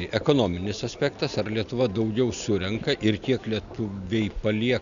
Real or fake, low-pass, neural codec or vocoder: real; 7.2 kHz; none